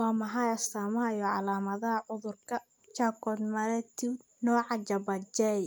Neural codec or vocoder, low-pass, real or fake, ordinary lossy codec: none; none; real; none